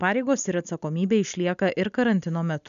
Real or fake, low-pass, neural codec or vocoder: real; 7.2 kHz; none